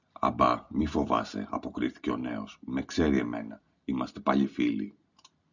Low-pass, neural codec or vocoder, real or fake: 7.2 kHz; none; real